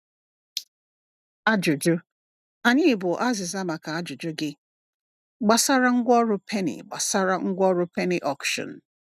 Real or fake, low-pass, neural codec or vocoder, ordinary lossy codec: real; 14.4 kHz; none; none